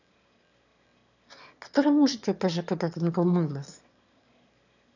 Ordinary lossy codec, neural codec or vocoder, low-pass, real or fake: none; autoencoder, 22.05 kHz, a latent of 192 numbers a frame, VITS, trained on one speaker; 7.2 kHz; fake